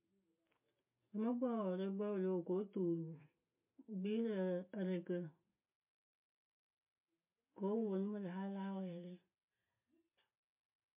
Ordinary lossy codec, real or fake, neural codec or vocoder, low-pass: MP3, 32 kbps; real; none; 3.6 kHz